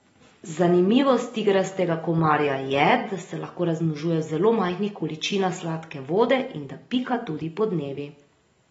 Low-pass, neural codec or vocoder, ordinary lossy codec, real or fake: 10.8 kHz; none; AAC, 24 kbps; real